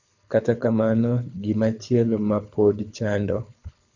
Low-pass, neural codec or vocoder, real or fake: 7.2 kHz; codec, 24 kHz, 6 kbps, HILCodec; fake